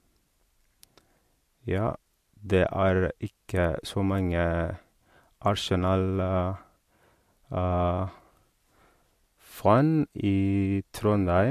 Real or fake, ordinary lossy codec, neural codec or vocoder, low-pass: real; MP3, 64 kbps; none; 14.4 kHz